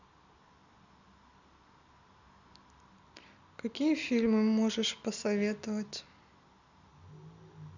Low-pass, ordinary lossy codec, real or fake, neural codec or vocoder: 7.2 kHz; none; real; none